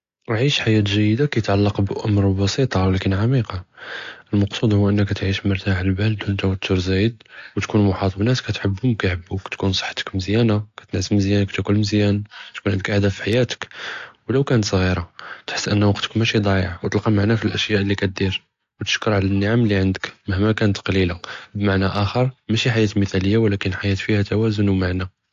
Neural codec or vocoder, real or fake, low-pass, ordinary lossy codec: none; real; 7.2 kHz; AAC, 48 kbps